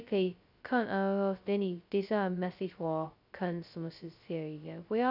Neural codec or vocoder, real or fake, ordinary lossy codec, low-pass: codec, 16 kHz, 0.2 kbps, FocalCodec; fake; none; 5.4 kHz